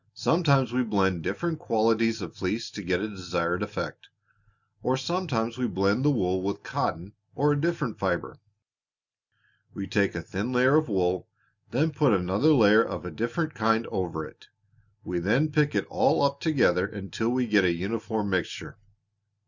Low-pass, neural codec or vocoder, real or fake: 7.2 kHz; none; real